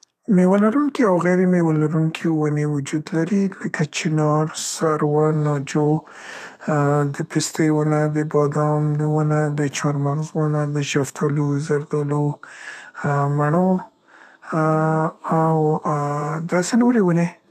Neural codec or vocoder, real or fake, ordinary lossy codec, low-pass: codec, 32 kHz, 1.9 kbps, SNAC; fake; none; 14.4 kHz